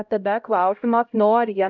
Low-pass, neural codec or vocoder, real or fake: 7.2 kHz; codec, 16 kHz, 0.5 kbps, X-Codec, HuBERT features, trained on LibriSpeech; fake